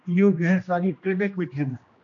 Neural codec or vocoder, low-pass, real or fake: codec, 16 kHz, 1 kbps, X-Codec, HuBERT features, trained on general audio; 7.2 kHz; fake